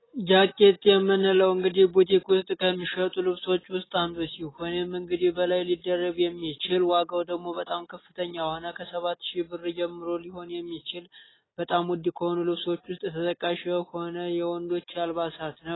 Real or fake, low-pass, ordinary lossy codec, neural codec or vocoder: real; 7.2 kHz; AAC, 16 kbps; none